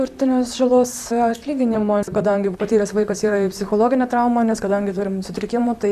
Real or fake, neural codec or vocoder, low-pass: fake; vocoder, 44.1 kHz, 128 mel bands, Pupu-Vocoder; 14.4 kHz